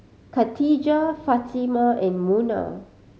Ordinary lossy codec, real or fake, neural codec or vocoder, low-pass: none; real; none; none